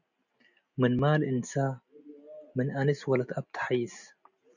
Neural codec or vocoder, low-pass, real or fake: none; 7.2 kHz; real